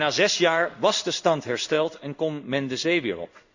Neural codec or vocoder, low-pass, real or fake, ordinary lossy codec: codec, 16 kHz in and 24 kHz out, 1 kbps, XY-Tokenizer; 7.2 kHz; fake; none